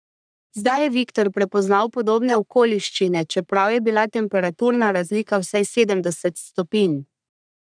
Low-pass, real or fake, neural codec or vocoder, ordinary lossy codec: 9.9 kHz; fake; codec, 44.1 kHz, 3.4 kbps, Pupu-Codec; none